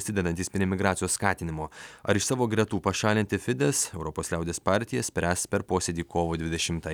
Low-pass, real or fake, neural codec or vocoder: 19.8 kHz; real; none